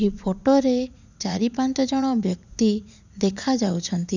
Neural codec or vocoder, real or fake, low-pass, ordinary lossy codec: vocoder, 44.1 kHz, 80 mel bands, Vocos; fake; 7.2 kHz; none